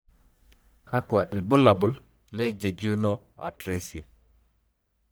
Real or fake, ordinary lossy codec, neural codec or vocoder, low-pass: fake; none; codec, 44.1 kHz, 1.7 kbps, Pupu-Codec; none